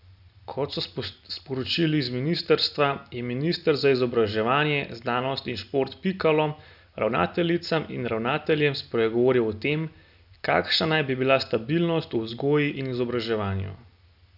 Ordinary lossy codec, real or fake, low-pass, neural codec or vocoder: none; real; 5.4 kHz; none